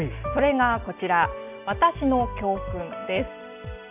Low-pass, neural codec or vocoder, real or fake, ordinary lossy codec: 3.6 kHz; none; real; none